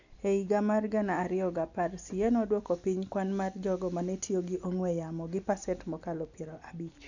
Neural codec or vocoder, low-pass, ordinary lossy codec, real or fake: none; 7.2 kHz; none; real